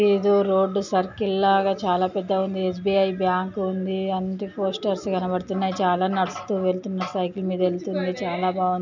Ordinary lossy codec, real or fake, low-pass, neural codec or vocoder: none; real; 7.2 kHz; none